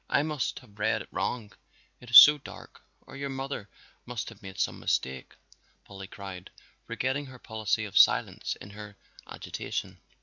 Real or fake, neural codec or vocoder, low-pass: real; none; 7.2 kHz